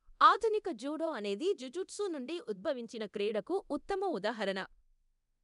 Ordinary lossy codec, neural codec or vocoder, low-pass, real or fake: none; codec, 24 kHz, 0.9 kbps, DualCodec; 10.8 kHz; fake